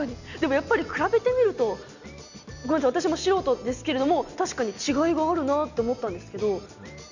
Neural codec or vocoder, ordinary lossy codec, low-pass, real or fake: none; none; 7.2 kHz; real